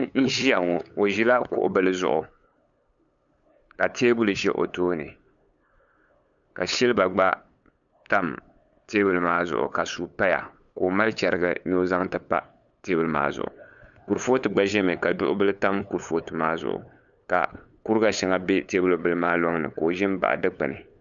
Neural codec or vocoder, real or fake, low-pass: codec, 16 kHz, 8 kbps, FunCodec, trained on LibriTTS, 25 frames a second; fake; 7.2 kHz